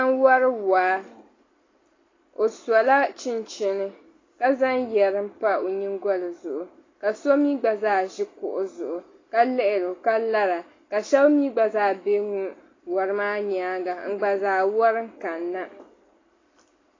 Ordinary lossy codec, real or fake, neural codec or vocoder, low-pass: AAC, 32 kbps; real; none; 7.2 kHz